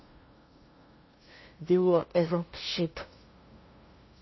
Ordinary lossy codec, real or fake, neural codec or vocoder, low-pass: MP3, 24 kbps; fake; codec, 16 kHz, 0.5 kbps, FunCodec, trained on LibriTTS, 25 frames a second; 7.2 kHz